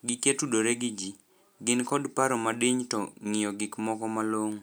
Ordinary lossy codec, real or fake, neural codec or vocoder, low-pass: none; real; none; none